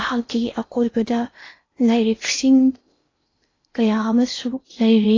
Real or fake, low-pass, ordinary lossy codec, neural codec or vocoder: fake; 7.2 kHz; AAC, 32 kbps; codec, 16 kHz in and 24 kHz out, 0.8 kbps, FocalCodec, streaming, 65536 codes